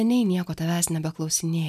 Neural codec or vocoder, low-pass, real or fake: none; 14.4 kHz; real